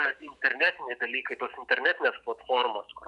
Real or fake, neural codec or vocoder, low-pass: fake; autoencoder, 48 kHz, 128 numbers a frame, DAC-VAE, trained on Japanese speech; 10.8 kHz